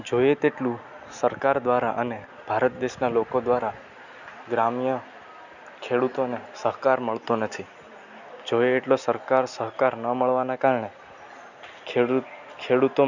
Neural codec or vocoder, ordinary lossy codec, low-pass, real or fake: none; none; 7.2 kHz; real